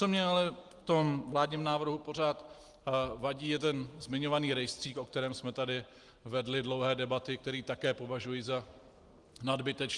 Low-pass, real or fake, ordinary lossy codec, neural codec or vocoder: 10.8 kHz; real; Opus, 24 kbps; none